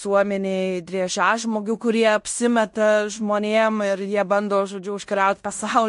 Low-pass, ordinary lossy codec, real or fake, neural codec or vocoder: 10.8 kHz; MP3, 48 kbps; fake; codec, 16 kHz in and 24 kHz out, 0.9 kbps, LongCat-Audio-Codec, fine tuned four codebook decoder